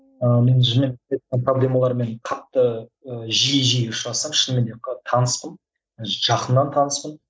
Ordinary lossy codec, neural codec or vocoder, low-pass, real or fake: none; none; none; real